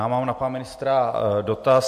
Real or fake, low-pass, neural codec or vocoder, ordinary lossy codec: real; 14.4 kHz; none; MP3, 64 kbps